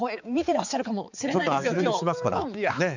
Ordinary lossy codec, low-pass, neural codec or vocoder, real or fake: none; 7.2 kHz; codec, 16 kHz, 4 kbps, X-Codec, HuBERT features, trained on balanced general audio; fake